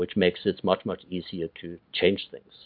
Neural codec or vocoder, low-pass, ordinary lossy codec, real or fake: none; 5.4 kHz; MP3, 48 kbps; real